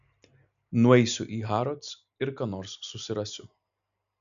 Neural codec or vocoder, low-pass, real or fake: none; 7.2 kHz; real